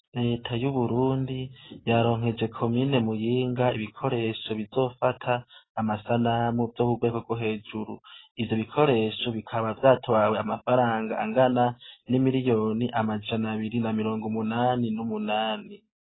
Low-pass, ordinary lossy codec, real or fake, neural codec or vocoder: 7.2 kHz; AAC, 16 kbps; real; none